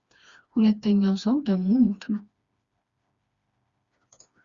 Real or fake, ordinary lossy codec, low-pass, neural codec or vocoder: fake; Opus, 64 kbps; 7.2 kHz; codec, 16 kHz, 2 kbps, FreqCodec, smaller model